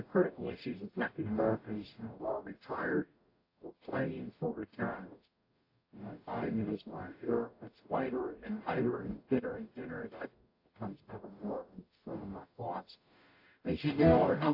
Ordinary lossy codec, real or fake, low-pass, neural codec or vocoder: AAC, 32 kbps; fake; 5.4 kHz; codec, 44.1 kHz, 0.9 kbps, DAC